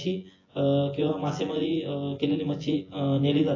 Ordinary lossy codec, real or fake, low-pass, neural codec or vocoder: AAC, 32 kbps; fake; 7.2 kHz; vocoder, 24 kHz, 100 mel bands, Vocos